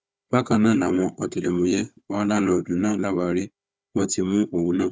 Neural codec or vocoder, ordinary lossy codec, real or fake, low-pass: codec, 16 kHz, 4 kbps, FunCodec, trained on Chinese and English, 50 frames a second; none; fake; none